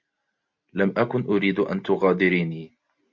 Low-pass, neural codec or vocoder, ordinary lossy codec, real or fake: 7.2 kHz; none; MP3, 64 kbps; real